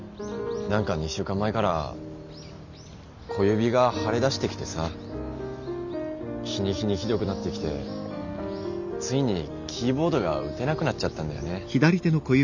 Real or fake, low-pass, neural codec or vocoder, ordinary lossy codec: real; 7.2 kHz; none; none